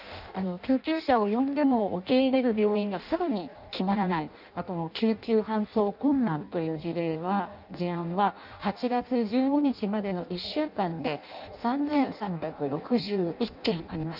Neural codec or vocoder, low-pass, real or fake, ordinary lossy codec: codec, 16 kHz in and 24 kHz out, 0.6 kbps, FireRedTTS-2 codec; 5.4 kHz; fake; none